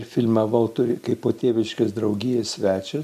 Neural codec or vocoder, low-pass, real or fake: none; 14.4 kHz; real